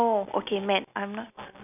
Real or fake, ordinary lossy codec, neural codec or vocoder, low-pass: real; none; none; 3.6 kHz